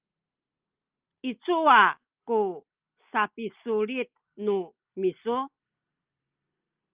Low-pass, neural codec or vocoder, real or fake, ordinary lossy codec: 3.6 kHz; none; real; Opus, 24 kbps